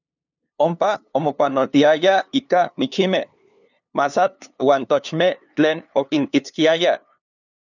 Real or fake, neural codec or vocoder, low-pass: fake; codec, 16 kHz, 2 kbps, FunCodec, trained on LibriTTS, 25 frames a second; 7.2 kHz